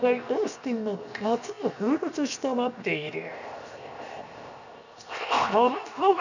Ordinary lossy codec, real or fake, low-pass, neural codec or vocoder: none; fake; 7.2 kHz; codec, 16 kHz, 0.7 kbps, FocalCodec